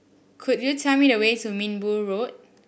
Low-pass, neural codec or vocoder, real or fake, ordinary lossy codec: none; none; real; none